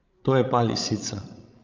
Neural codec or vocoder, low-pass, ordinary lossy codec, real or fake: codec, 16 kHz, 16 kbps, FreqCodec, larger model; 7.2 kHz; Opus, 24 kbps; fake